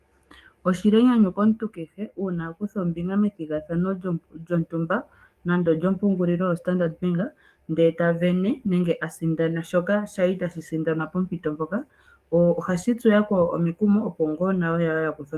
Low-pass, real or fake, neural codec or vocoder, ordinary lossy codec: 14.4 kHz; fake; codec, 44.1 kHz, 7.8 kbps, DAC; Opus, 32 kbps